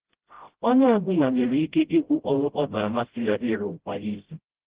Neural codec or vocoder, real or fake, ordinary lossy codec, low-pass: codec, 16 kHz, 0.5 kbps, FreqCodec, smaller model; fake; Opus, 16 kbps; 3.6 kHz